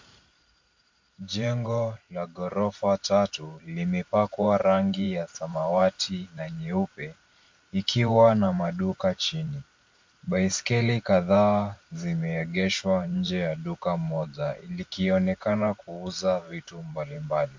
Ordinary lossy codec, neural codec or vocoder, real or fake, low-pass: MP3, 48 kbps; vocoder, 44.1 kHz, 128 mel bands every 512 samples, BigVGAN v2; fake; 7.2 kHz